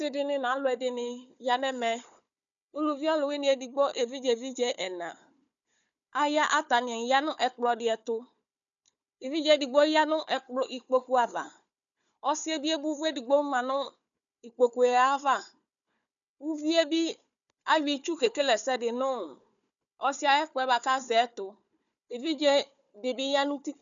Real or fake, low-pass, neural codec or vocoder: fake; 7.2 kHz; codec, 16 kHz, 4 kbps, FunCodec, trained on Chinese and English, 50 frames a second